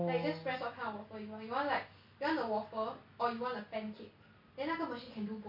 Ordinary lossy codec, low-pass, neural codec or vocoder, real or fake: MP3, 32 kbps; 5.4 kHz; none; real